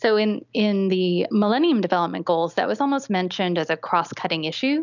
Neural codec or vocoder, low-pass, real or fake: none; 7.2 kHz; real